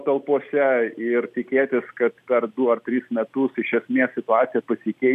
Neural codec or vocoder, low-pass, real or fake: none; 14.4 kHz; real